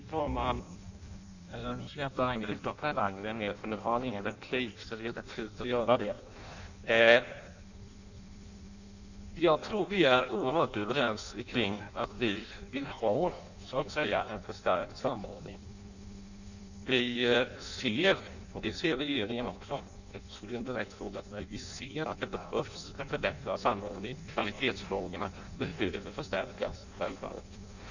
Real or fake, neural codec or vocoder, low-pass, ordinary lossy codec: fake; codec, 16 kHz in and 24 kHz out, 0.6 kbps, FireRedTTS-2 codec; 7.2 kHz; none